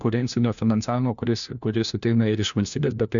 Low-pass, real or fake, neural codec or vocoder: 7.2 kHz; fake; codec, 16 kHz, 1 kbps, FunCodec, trained on LibriTTS, 50 frames a second